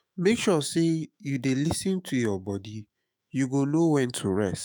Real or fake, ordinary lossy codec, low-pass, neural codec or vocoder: fake; none; none; autoencoder, 48 kHz, 128 numbers a frame, DAC-VAE, trained on Japanese speech